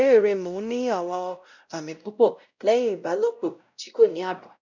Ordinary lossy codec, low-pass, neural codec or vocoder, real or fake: none; 7.2 kHz; codec, 16 kHz, 0.5 kbps, X-Codec, WavLM features, trained on Multilingual LibriSpeech; fake